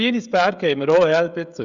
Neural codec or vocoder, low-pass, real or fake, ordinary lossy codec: codec, 16 kHz, 16 kbps, FreqCodec, smaller model; 7.2 kHz; fake; Opus, 64 kbps